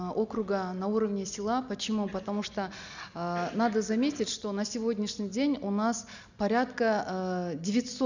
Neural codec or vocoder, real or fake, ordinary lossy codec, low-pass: none; real; none; 7.2 kHz